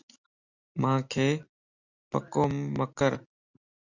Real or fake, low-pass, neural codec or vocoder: real; 7.2 kHz; none